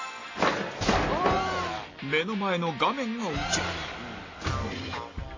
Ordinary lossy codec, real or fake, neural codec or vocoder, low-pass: AAC, 32 kbps; real; none; 7.2 kHz